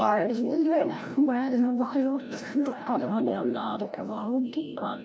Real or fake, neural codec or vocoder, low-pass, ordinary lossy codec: fake; codec, 16 kHz, 0.5 kbps, FreqCodec, larger model; none; none